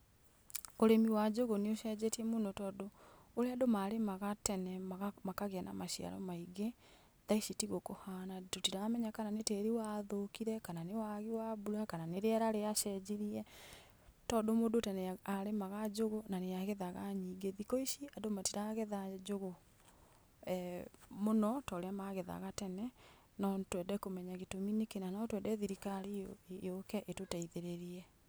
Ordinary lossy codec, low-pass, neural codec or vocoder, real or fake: none; none; none; real